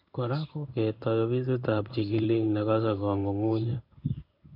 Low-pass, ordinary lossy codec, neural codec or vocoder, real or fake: 5.4 kHz; MP3, 48 kbps; codec, 16 kHz in and 24 kHz out, 1 kbps, XY-Tokenizer; fake